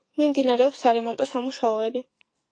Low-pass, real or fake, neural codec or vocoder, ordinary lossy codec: 9.9 kHz; fake; codec, 44.1 kHz, 2.6 kbps, SNAC; AAC, 48 kbps